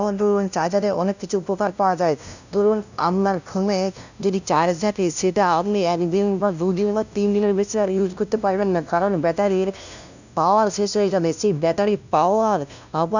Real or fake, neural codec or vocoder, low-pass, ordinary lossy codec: fake; codec, 16 kHz, 0.5 kbps, FunCodec, trained on LibriTTS, 25 frames a second; 7.2 kHz; none